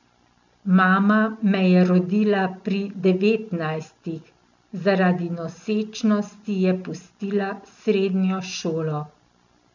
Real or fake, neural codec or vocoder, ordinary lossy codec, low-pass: real; none; none; 7.2 kHz